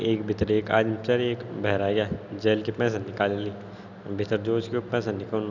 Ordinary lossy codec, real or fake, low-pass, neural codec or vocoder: none; real; 7.2 kHz; none